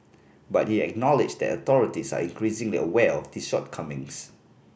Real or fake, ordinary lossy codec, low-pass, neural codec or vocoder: real; none; none; none